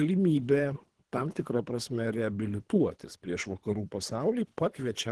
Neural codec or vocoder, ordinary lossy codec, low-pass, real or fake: codec, 24 kHz, 3 kbps, HILCodec; Opus, 16 kbps; 10.8 kHz; fake